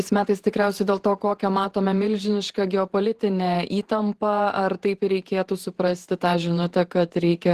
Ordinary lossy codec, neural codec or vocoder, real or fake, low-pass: Opus, 16 kbps; vocoder, 48 kHz, 128 mel bands, Vocos; fake; 14.4 kHz